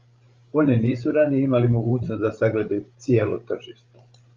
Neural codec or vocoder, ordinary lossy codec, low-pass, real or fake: codec, 16 kHz, 16 kbps, FreqCodec, larger model; Opus, 32 kbps; 7.2 kHz; fake